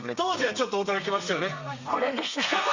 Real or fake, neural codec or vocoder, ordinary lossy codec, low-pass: fake; codec, 32 kHz, 1.9 kbps, SNAC; Opus, 64 kbps; 7.2 kHz